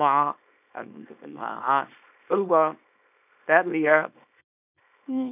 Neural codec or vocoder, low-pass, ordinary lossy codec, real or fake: codec, 24 kHz, 0.9 kbps, WavTokenizer, small release; 3.6 kHz; none; fake